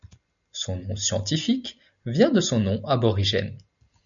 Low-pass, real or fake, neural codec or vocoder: 7.2 kHz; real; none